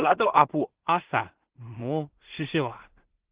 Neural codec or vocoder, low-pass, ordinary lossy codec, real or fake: codec, 16 kHz in and 24 kHz out, 0.4 kbps, LongCat-Audio-Codec, two codebook decoder; 3.6 kHz; Opus, 16 kbps; fake